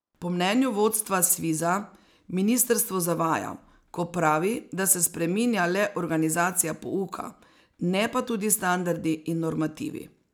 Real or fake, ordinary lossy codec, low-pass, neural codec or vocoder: real; none; none; none